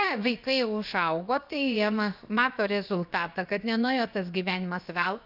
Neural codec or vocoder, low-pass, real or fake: codec, 16 kHz, about 1 kbps, DyCAST, with the encoder's durations; 5.4 kHz; fake